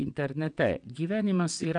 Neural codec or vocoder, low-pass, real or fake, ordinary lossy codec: vocoder, 22.05 kHz, 80 mel bands, WaveNeXt; 9.9 kHz; fake; MP3, 96 kbps